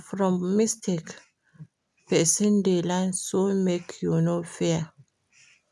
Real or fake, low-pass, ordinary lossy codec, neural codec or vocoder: real; none; none; none